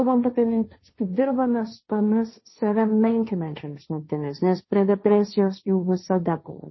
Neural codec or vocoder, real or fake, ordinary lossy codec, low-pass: codec, 16 kHz, 1.1 kbps, Voila-Tokenizer; fake; MP3, 24 kbps; 7.2 kHz